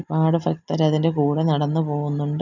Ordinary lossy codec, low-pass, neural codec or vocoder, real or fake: none; 7.2 kHz; none; real